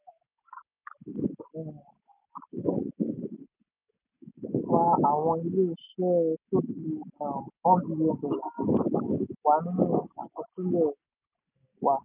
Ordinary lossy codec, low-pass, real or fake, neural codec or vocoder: none; 3.6 kHz; real; none